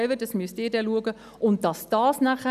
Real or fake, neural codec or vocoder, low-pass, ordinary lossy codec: real; none; 14.4 kHz; none